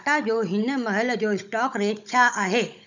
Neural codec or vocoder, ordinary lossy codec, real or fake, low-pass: codec, 16 kHz, 16 kbps, FunCodec, trained on Chinese and English, 50 frames a second; none; fake; 7.2 kHz